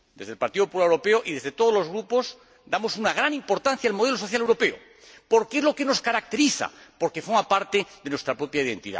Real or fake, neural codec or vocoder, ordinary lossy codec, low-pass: real; none; none; none